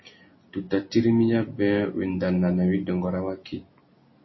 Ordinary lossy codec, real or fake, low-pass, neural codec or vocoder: MP3, 24 kbps; real; 7.2 kHz; none